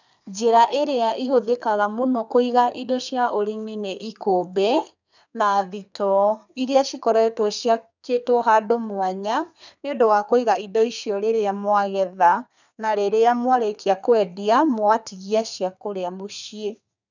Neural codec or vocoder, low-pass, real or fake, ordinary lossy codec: codec, 32 kHz, 1.9 kbps, SNAC; 7.2 kHz; fake; none